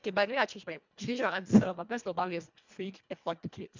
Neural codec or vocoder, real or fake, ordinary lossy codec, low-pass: codec, 24 kHz, 1.5 kbps, HILCodec; fake; MP3, 64 kbps; 7.2 kHz